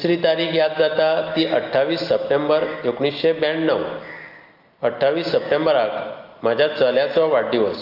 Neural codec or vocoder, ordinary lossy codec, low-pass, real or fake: none; Opus, 24 kbps; 5.4 kHz; real